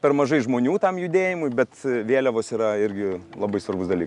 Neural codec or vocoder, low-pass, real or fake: none; 10.8 kHz; real